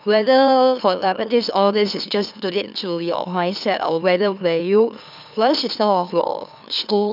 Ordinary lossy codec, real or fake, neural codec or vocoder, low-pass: none; fake; autoencoder, 44.1 kHz, a latent of 192 numbers a frame, MeloTTS; 5.4 kHz